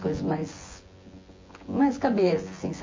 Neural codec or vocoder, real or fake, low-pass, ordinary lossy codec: vocoder, 24 kHz, 100 mel bands, Vocos; fake; 7.2 kHz; MP3, 32 kbps